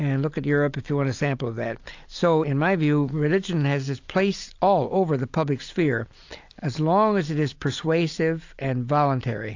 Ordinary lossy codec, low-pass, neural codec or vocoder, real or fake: AAC, 48 kbps; 7.2 kHz; none; real